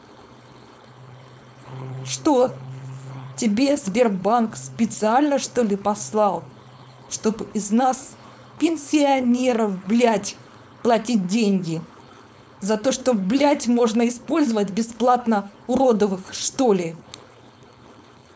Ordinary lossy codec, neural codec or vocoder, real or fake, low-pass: none; codec, 16 kHz, 4.8 kbps, FACodec; fake; none